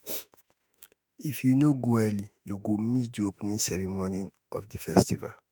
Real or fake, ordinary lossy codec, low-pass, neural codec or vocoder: fake; none; none; autoencoder, 48 kHz, 32 numbers a frame, DAC-VAE, trained on Japanese speech